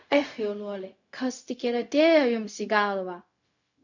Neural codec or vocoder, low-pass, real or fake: codec, 16 kHz, 0.4 kbps, LongCat-Audio-Codec; 7.2 kHz; fake